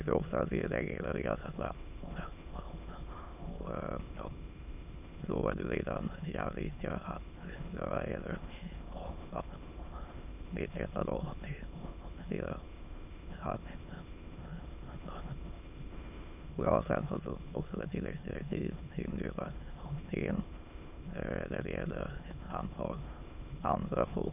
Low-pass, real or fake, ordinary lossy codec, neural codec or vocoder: 3.6 kHz; fake; none; autoencoder, 22.05 kHz, a latent of 192 numbers a frame, VITS, trained on many speakers